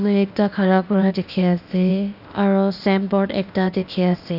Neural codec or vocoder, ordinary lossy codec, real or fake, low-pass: codec, 16 kHz, 0.8 kbps, ZipCodec; none; fake; 5.4 kHz